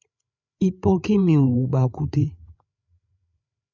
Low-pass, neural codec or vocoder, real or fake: 7.2 kHz; codec, 16 kHz, 8 kbps, FreqCodec, larger model; fake